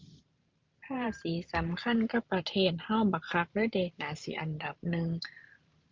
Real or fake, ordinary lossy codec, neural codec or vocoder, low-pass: fake; Opus, 24 kbps; vocoder, 44.1 kHz, 128 mel bands every 512 samples, BigVGAN v2; 7.2 kHz